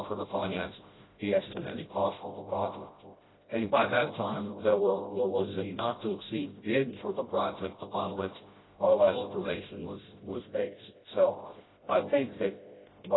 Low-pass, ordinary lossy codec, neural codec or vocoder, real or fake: 7.2 kHz; AAC, 16 kbps; codec, 16 kHz, 0.5 kbps, FreqCodec, smaller model; fake